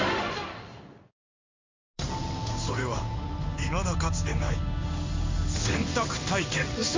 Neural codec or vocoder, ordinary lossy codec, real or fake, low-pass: codec, 16 kHz in and 24 kHz out, 1 kbps, XY-Tokenizer; MP3, 48 kbps; fake; 7.2 kHz